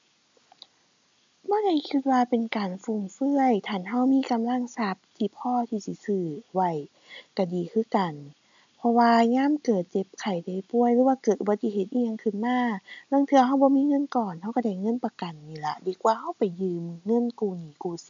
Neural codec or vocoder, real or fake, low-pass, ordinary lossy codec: none; real; 7.2 kHz; AAC, 64 kbps